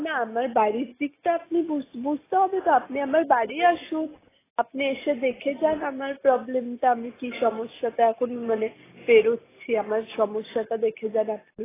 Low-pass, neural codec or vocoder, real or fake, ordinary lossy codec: 3.6 kHz; none; real; AAC, 16 kbps